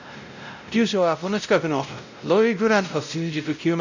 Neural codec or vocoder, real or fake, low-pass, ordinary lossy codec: codec, 16 kHz, 0.5 kbps, X-Codec, WavLM features, trained on Multilingual LibriSpeech; fake; 7.2 kHz; none